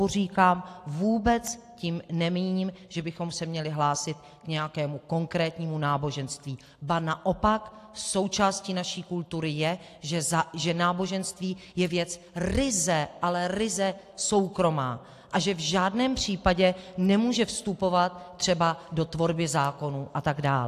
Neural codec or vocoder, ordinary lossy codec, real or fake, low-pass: none; AAC, 64 kbps; real; 14.4 kHz